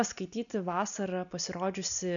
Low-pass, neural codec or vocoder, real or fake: 7.2 kHz; none; real